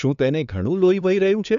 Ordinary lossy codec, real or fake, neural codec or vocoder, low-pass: none; fake; codec, 16 kHz, 2 kbps, FunCodec, trained on LibriTTS, 25 frames a second; 7.2 kHz